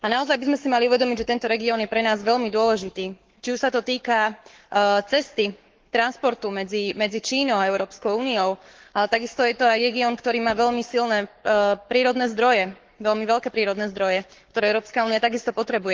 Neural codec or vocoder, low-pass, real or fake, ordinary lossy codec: codec, 44.1 kHz, 7.8 kbps, Pupu-Codec; 7.2 kHz; fake; Opus, 16 kbps